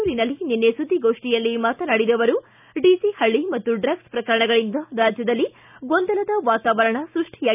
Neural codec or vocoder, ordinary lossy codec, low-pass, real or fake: none; none; 3.6 kHz; real